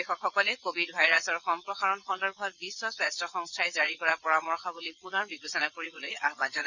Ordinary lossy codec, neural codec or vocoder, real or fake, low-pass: none; vocoder, 22.05 kHz, 80 mel bands, WaveNeXt; fake; 7.2 kHz